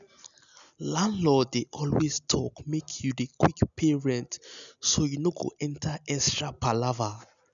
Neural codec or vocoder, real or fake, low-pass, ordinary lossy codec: none; real; 7.2 kHz; none